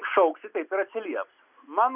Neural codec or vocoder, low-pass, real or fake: none; 3.6 kHz; real